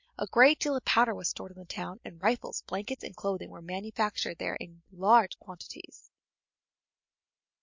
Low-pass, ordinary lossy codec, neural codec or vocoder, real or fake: 7.2 kHz; MP3, 48 kbps; none; real